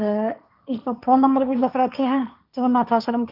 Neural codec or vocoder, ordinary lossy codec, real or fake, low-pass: codec, 16 kHz, 1.1 kbps, Voila-Tokenizer; none; fake; 5.4 kHz